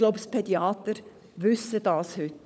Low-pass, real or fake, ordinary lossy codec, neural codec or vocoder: none; fake; none; codec, 16 kHz, 16 kbps, FunCodec, trained on LibriTTS, 50 frames a second